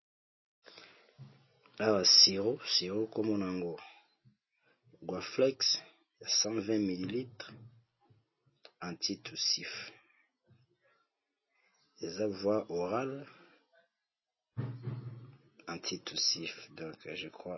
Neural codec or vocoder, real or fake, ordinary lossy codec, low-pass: none; real; MP3, 24 kbps; 7.2 kHz